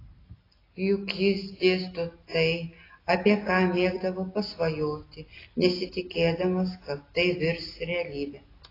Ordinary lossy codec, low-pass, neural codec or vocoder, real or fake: AAC, 24 kbps; 5.4 kHz; none; real